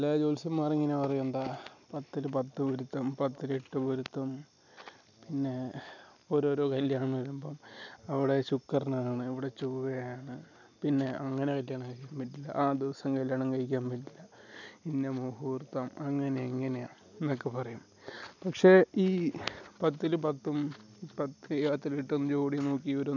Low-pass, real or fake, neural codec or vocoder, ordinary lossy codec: 7.2 kHz; real; none; none